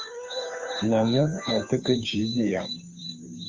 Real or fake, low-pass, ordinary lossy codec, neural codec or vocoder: fake; 7.2 kHz; Opus, 32 kbps; codec, 16 kHz, 2 kbps, FunCodec, trained on Chinese and English, 25 frames a second